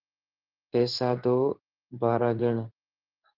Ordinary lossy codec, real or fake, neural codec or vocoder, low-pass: Opus, 16 kbps; fake; codec, 16 kHz in and 24 kHz out, 1 kbps, XY-Tokenizer; 5.4 kHz